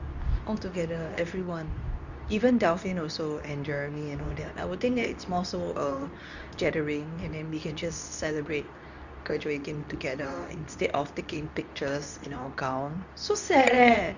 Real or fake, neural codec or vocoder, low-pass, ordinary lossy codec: fake; codec, 24 kHz, 0.9 kbps, WavTokenizer, medium speech release version 2; 7.2 kHz; none